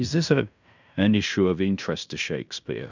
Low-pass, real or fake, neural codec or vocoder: 7.2 kHz; fake; codec, 16 kHz in and 24 kHz out, 0.9 kbps, LongCat-Audio-Codec, fine tuned four codebook decoder